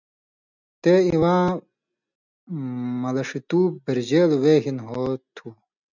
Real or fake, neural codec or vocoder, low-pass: real; none; 7.2 kHz